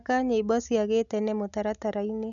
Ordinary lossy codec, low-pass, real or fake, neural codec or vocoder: none; 7.2 kHz; real; none